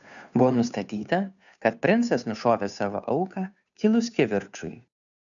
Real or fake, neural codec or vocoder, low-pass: fake; codec, 16 kHz, 2 kbps, FunCodec, trained on Chinese and English, 25 frames a second; 7.2 kHz